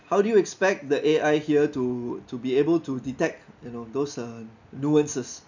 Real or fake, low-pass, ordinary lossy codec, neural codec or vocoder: real; 7.2 kHz; none; none